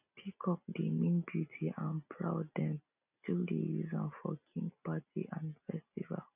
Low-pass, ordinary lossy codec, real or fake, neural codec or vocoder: 3.6 kHz; none; real; none